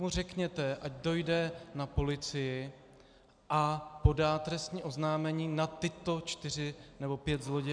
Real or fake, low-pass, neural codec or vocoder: real; 9.9 kHz; none